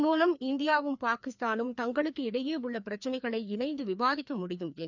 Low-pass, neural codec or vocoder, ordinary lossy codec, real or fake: 7.2 kHz; codec, 16 kHz, 2 kbps, FreqCodec, larger model; none; fake